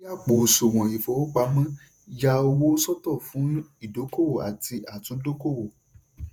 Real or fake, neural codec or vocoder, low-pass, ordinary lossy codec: fake; vocoder, 48 kHz, 128 mel bands, Vocos; none; none